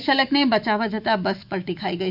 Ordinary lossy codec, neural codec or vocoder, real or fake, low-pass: none; autoencoder, 48 kHz, 128 numbers a frame, DAC-VAE, trained on Japanese speech; fake; 5.4 kHz